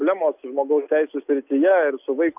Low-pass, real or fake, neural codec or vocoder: 3.6 kHz; real; none